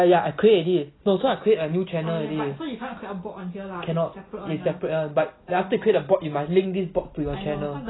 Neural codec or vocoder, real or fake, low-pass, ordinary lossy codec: none; real; 7.2 kHz; AAC, 16 kbps